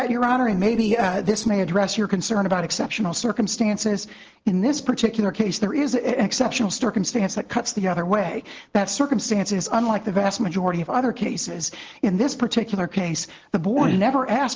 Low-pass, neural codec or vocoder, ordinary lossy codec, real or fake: 7.2 kHz; none; Opus, 16 kbps; real